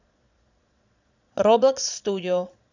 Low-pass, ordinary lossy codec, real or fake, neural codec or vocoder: 7.2 kHz; none; fake; vocoder, 22.05 kHz, 80 mel bands, Vocos